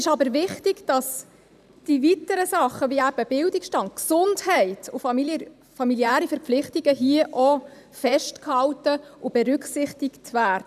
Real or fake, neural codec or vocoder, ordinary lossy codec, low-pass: fake; vocoder, 44.1 kHz, 128 mel bands every 512 samples, BigVGAN v2; none; 14.4 kHz